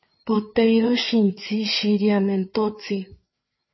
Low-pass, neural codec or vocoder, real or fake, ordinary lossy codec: 7.2 kHz; codec, 16 kHz, 4 kbps, FreqCodec, larger model; fake; MP3, 24 kbps